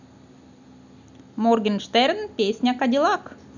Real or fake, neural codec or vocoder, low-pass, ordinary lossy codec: real; none; 7.2 kHz; none